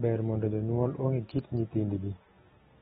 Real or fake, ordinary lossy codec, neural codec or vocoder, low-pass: real; AAC, 16 kbps; none; 14.4 kHz